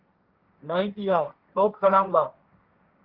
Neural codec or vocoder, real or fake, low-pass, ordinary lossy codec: codec, 16 kHz, 1.1 kbps, Voila-Tokenizer; fake; 5.4 kHz; Opus, 24 kbps